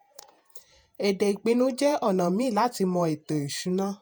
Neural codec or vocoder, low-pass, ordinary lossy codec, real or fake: vocoder, 48 kHz, 128 mel bands, Vocos; none; none; fake